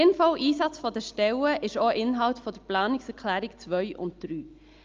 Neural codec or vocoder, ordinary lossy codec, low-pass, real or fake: none; Opus, 32 kbps; 7.2 kHz; real